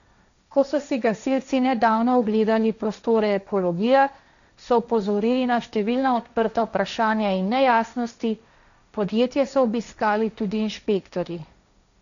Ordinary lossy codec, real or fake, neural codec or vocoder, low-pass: none; fake; codec, 16 kHz, 1.1 kbps, Voila-Tokenizer; 7.2 kHz